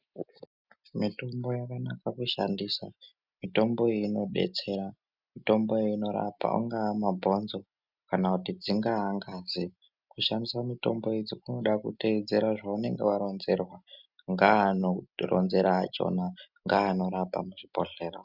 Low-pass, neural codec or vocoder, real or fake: 5.4 kHz; none; real